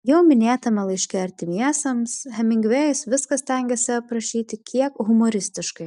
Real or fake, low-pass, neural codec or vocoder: real; 10.8 kHz; none